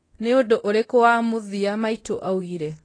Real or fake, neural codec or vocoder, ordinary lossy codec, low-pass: fake; codec, 24 kHz, 0.9 kbps, DualCodec; AAC, 32 kbps; 9.9 kHz